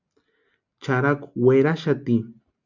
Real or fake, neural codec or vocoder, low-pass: real; none; 7.2 kHz